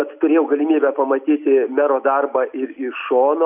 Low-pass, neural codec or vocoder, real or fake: 3.6 kHz; none; real